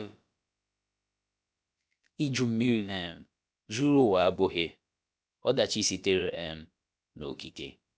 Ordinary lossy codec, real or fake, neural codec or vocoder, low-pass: none; fake; codec, 16 kHz, about 1 kbps, DyCAST, with the encoder's durations; none